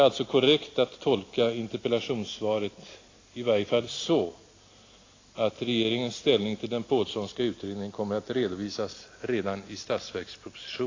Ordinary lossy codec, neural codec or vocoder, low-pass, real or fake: AAC, 32 kbps; none; 7.2 kHz; real